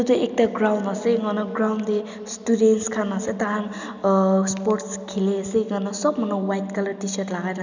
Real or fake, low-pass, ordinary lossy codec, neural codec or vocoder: real; 7.2 kHz; none; none